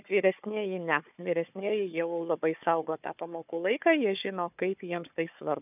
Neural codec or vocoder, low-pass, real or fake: codec, 16 kHz, 4 kbps, FunCodec, trained on Chinese and English, 50 frames a second; 3.6 kHz; fake